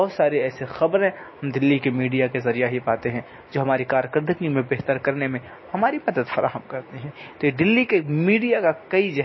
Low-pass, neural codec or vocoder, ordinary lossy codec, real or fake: 7.2 kHz; none; MP3, 24 kbps; real